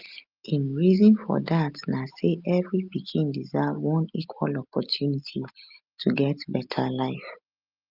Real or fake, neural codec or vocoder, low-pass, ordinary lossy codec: real; none; 5.4 kHz; Opus, 24 kbps